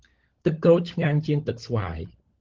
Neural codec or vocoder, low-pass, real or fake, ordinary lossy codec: codec, 16 kHz, 16 kbps, FunCodec, trained on LibriTTS, 50 frames a second; 7.2 kHz; fake; Opus, 16 kbps